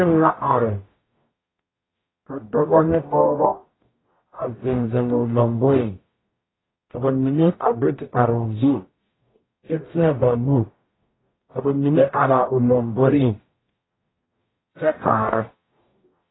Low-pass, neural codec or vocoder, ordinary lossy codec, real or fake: 7.2 kHz; codec, 44.1 kHz, 0.9 kbps, DAC; AAC, 16 kbps; fake